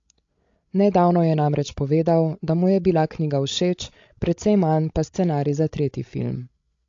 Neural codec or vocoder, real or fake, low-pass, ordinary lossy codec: codec, 16 kHz, 16 kbps, FreqCodec, larger model; fake; 7.2 kHz; AAC, 48 kbps